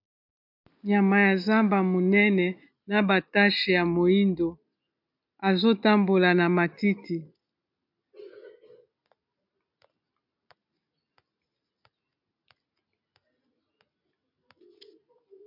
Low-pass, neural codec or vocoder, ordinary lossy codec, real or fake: 5.4 kHz; none; MP3, 48 kbps; real